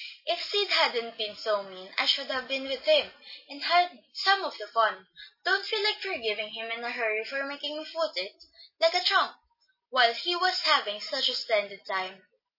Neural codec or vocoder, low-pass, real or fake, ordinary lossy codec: none; 5.4 kHz; real; MP3, 24 kbps